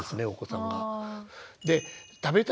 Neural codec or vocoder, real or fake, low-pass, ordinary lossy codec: none; real; none; none